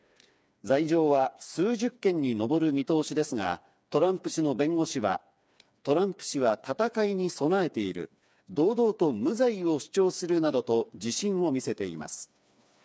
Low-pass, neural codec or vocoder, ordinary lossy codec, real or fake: none; codec, 16 kHz, 4 kbps, FreqCodec, smaller model; none; fake